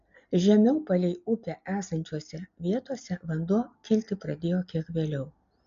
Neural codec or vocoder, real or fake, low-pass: none; real; 7.2 kHz